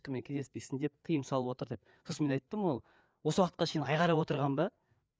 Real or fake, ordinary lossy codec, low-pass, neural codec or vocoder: fake; none; none; codec, 16 kHz, 4 kbps, FreqCodec, larger model